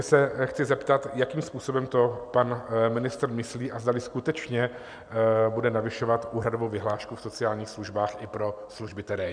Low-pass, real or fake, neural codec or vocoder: 9.9 kHz; real; none